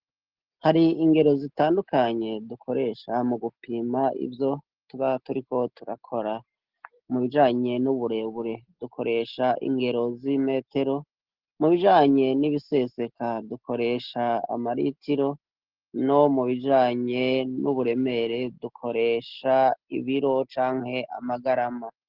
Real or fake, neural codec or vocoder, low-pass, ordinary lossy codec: real; none; 5.4 kHz; Opus, 16 kbps